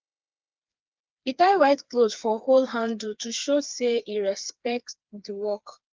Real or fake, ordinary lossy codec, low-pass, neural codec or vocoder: fake; Opus, 24 kbps; 7.2 kHz; codec, 16 kHz, 4 kbps, FreqCodec, smaller model